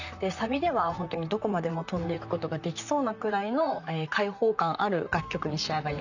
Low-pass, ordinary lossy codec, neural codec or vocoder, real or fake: 7.2 kHz; none; vocoder, 44.1 kHz, 128 mel bands, Pupu-Vocoder; fake